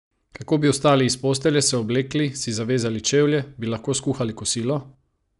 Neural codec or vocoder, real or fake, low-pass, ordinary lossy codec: none; real; 10.8 kHz; none